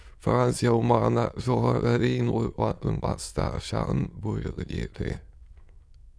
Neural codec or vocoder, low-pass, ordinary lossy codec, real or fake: autoencoder, 22.05 kHz, a latent of 192 numbers a frame, VITS, trained on many speakers; none; none; fake